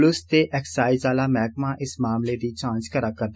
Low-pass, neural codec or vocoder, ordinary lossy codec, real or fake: none; none; none; real